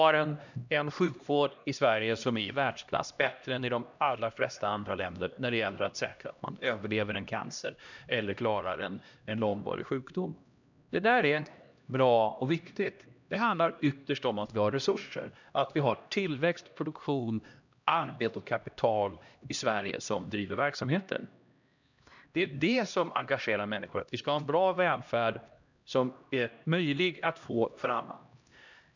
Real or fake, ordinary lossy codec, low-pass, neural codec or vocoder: fake; none; 7.2 kHz; codec, 16 kHz, 1 kbps, X-Codec, HuBERT features, trained on LibriSpeech